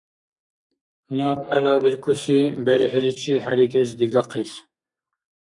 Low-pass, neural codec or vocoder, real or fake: 10.8 kHz; codec, 32 kHz, 1.9 kbps, SNAC; fake